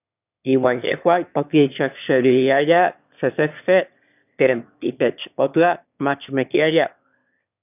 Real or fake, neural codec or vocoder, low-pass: fake; autoencoder, 22.05 kHz, a latent of 192 numbers a frame, VITS, trained on one speaker; 3.6 kHz